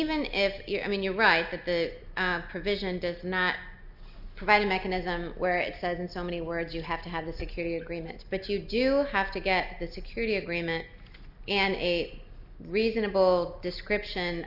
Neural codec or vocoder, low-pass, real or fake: none; 5.4 kHz; real